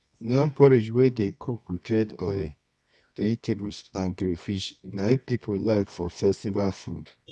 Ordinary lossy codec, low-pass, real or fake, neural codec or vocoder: none; none; fake; codec, 24 kHz, 0.9 kbps, WavTokenizer, medium music audio release